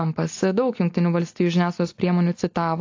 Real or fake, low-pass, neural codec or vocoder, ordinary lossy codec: real; 7.2 kHz; none; AAC, 48 kbps